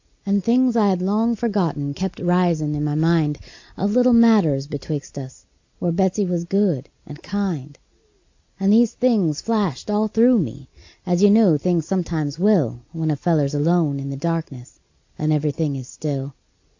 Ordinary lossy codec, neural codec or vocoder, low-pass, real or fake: AAC, 48 kbps; none; 7.2 kHz; real